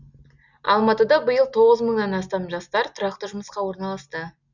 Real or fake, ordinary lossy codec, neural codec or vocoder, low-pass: real; none; none; 7.2 kHz